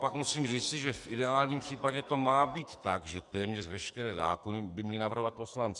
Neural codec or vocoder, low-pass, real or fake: codec, 44.1 kHz, 2.6 kbps, SNAC; 10.8 kHz; fake